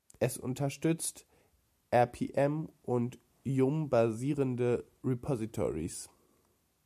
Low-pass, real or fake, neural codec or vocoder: 14.4 kHz; real; none